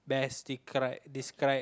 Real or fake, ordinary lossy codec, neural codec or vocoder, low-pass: real; none; none; none